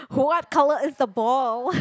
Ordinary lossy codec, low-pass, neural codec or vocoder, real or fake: none; none; none; real